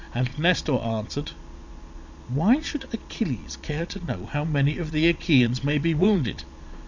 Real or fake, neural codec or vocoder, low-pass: fake; vocoder, 22.05 kHz, 80 mel bands, Vocos; 7.2 kHz